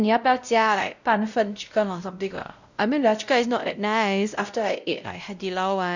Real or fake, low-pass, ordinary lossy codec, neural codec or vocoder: fake; 7.2 kHz; none; codec, 16 kHz, 0.5 kbps, X-Codec, WavLM features, trained on Multilingual LibriSpeech